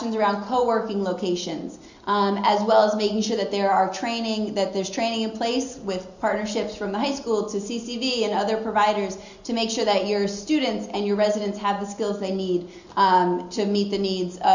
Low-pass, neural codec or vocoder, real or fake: 7.2 kHz; none; real